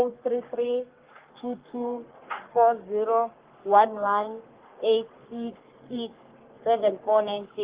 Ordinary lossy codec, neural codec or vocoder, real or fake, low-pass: Opus, 16 kbps; codec, 44.1 kHz, 3.4 kbps, Pupu-Codec; fake; 3.6 kHz